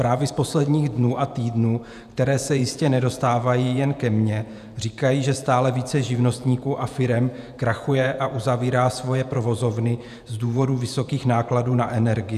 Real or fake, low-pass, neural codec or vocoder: fake; 14.4 kHz; vocoder, 48 kHz, 128 mel bands, Vocos